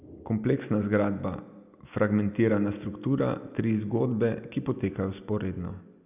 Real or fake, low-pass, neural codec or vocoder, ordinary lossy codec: real; 3.6 kHz; none; none